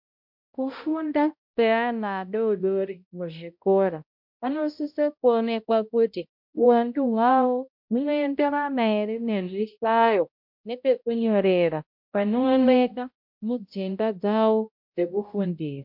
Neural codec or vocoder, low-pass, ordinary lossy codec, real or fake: codec, 16 kHz, 0.5 kbps, X-Codec, HuBERT features, trained on balanced general audio; 5.4 kHz; MP3, 48 kbps; fake